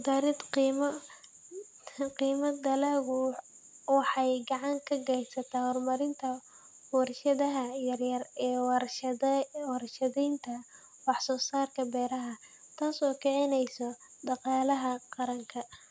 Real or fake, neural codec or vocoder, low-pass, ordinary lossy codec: real; none; none; none